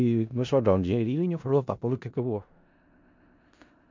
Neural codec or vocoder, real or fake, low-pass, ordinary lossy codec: codec, 16 kHz in and 24 kHz out, 0.4 kbps, LongCat-Audio-Codec, four codebook decoder; fake; 7.2 kHz; MP3, 64 kbps